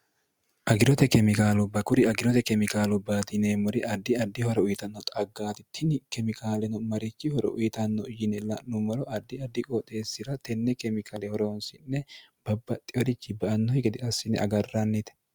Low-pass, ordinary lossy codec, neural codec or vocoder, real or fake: 19.8 kHz; Opus, 64 kbps; none; real